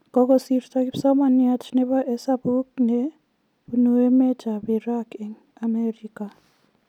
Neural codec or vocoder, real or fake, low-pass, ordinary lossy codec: none; real; 19.8 kHz; none